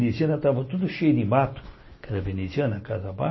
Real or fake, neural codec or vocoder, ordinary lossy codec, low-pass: real; none; MP3, 24 kbps; 7.2 kHz